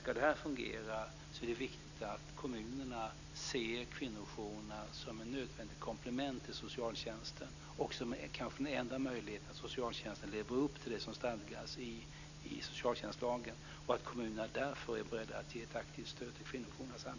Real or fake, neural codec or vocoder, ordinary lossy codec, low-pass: real; none; none; 7.2 kHz